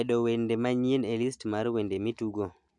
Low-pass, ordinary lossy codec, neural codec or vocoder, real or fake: 10.8 kHz; none; none; real